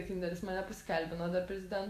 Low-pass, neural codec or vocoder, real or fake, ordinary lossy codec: 14.4 kHz; none; real; AAC, 64 kbps